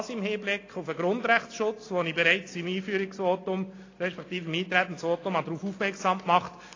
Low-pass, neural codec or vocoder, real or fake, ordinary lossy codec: 7.2 kHz; none; real; AAC, 32 kbps